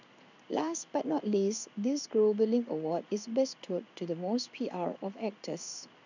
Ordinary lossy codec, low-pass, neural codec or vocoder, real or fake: none; 7.2 kHz; codec, 16 kHz in and 24 kHz out, 1 kbps, XY-Tokenizer; fake